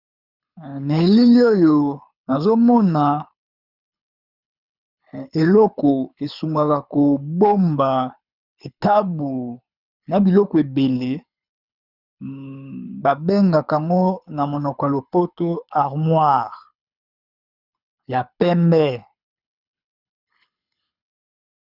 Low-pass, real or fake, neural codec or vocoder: 5.4 kHz; fake; codec, 24 kHz, 6 kbps, HILCodec